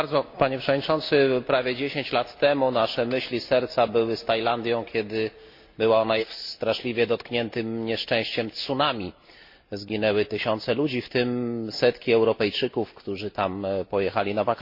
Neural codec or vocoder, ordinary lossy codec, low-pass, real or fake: none; MP3, 32 kbps; 5.4 kHz; real